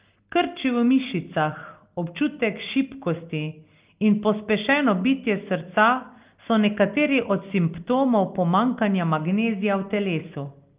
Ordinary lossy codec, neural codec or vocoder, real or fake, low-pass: Opus, 32 kbps; none; real; 3.6 kHz